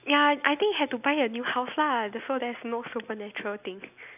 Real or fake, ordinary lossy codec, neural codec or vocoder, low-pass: real; none; none; 3.6 kHz